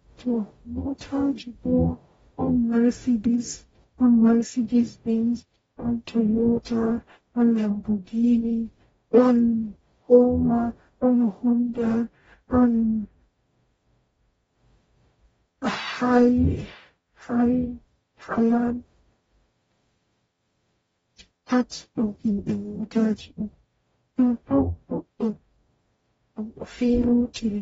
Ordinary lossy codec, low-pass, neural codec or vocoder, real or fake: AAC, 24 kbps; 19.8 kHz; codec, 44.1 kHz, 0.9 kbps, DAC; fake